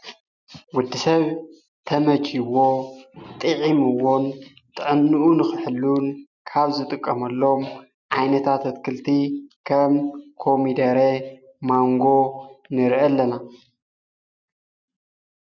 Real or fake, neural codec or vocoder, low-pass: real; none; 7.2 kHz